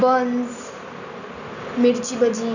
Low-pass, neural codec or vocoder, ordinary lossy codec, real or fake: 7.2 kHz; none; none; real